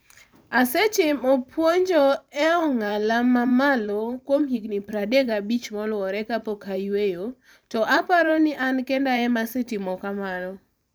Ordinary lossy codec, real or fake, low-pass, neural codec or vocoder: none; fake; none; vocoder, 44.1 kHz, 128 mel bands every 512 samples, BigVGAN v2